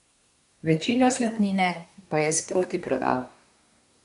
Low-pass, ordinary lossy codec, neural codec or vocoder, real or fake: 10.8 kHz; none; codec, 24 kHz, 1 kbps, SNAC; fake